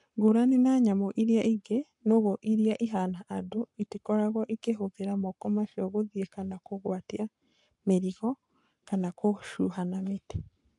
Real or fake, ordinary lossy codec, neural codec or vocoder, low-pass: fake; MP3, 64 kbps; codec, 44.1 kHz, 7.8 kbps, Pupu-Codec; 10.8 kHz